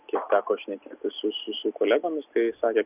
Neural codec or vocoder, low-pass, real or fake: none; 3.6 kHz; real